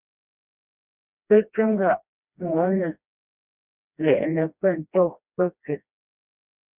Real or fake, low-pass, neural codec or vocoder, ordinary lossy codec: fake; 3.6 kHz; codec, 16 kHz, 1 kbps, FreqCodec, smaller model; Opus, 64 kbps